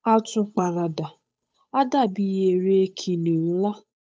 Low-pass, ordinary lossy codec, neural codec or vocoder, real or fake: none; none; codec, 16 kHz, 8 kbps, FunCodec, trained on Chinese and English, 25 frames a second; fake